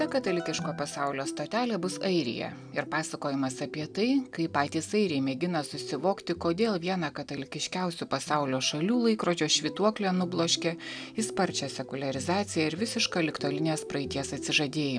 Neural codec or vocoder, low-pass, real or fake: none; 9.9 kHz; real